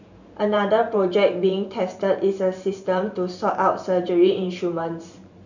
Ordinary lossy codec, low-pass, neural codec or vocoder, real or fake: none; 7.2 kHz; vocoder, 44.1 kHz, 128 mel bands every 512 samples, BigVGAN v2; fake